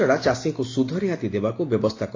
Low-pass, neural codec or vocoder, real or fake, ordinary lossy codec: 7.2 kHz; none; real; AAC, 32 kbps